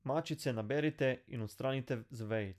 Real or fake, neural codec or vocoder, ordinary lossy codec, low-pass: real; none; none; 14.4 kHz